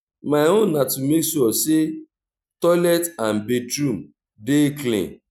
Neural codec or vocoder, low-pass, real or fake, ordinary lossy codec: none; 19.8 kHz; real; none